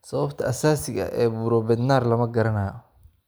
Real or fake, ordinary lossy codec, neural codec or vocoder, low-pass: real; none; none; none